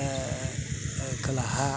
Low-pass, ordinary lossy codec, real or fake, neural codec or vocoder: none; none; real; none